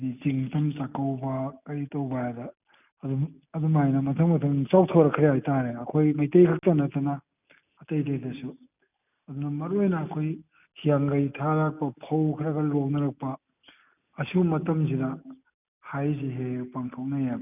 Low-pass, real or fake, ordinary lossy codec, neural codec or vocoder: 3.6 kHz; real; none; none